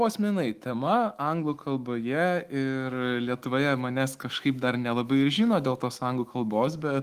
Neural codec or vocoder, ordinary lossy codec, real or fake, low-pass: autoencoder, 48 kHz, 128 numbers a frame, DAC-VAE, trained on Japanese speech; Opus, 24 kbps; fake; 14.4 kHz